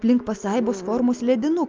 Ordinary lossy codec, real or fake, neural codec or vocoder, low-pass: Opus, 24 kbps; real; none; 7.2 kHz